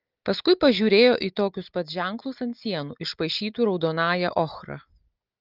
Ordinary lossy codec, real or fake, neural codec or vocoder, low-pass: Opus, 24 kbps; real; none; 5.4 kHz